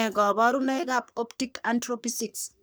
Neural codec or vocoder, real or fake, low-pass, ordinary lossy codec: codec, 44.1 kHz, 3.4 kbps, Pupu-Codec; fake; none; none